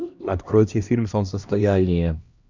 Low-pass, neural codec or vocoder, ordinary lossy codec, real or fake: 7.2 kHz; codec, 16 kHz, 1 kbps, X-Codec, HuBERT features, trained on LibriSpeech; Opus, 64 kbps; fake